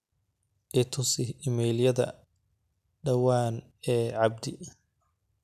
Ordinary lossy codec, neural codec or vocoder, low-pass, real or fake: none; none; 14.4 kHz; real